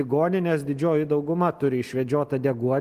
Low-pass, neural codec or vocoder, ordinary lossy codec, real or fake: 14.4 kHz; none; Opus, 24 kbps; real